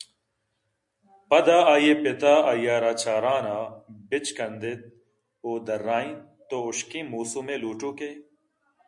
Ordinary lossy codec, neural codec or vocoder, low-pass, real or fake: MP3, 64 kbps; none; 10.8 kHz; real